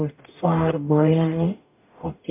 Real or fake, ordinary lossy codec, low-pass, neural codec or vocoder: fake; MP3, 32 kbps; 3.6 kHz; codec, 44.1 kHz, 0.9 kbps, DAC